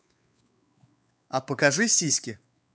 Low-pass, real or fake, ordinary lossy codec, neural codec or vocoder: none; fake; none; codec, 16 kHz, 4 kbps, X-Codec, WavLM features, trained on Multilingual LibriSpeech